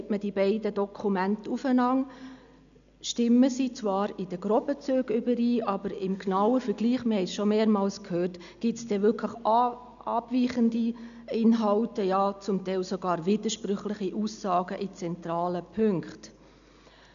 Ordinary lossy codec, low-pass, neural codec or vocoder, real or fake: none; 7.2 kHz; none; real